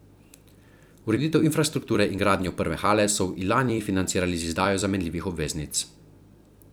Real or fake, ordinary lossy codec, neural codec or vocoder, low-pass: fake; none; vocoder, 44.1 kHz, 128 mel bands every 256 samples, BigVGAN v2; none